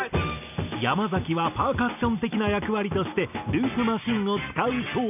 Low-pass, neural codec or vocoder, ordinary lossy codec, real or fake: 3.6 kHz; none; none; real